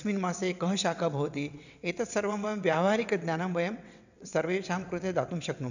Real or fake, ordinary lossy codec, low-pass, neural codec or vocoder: fake; none; 7.2 kHz; vocoder, 22.05 kHz, 80 mel bands, WaveNeXt